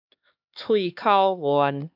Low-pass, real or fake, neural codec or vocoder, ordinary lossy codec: 5.4 kHz; fake; codec, 16 kHz, 2 kbps, X-Codec, HuBERT features, trained on LibriSpeech; AAC, 48 kbps